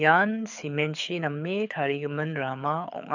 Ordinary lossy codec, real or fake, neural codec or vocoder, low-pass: none; fake; codec, 24 kHz, 6 kbps, HILCodec; 7.2 kHz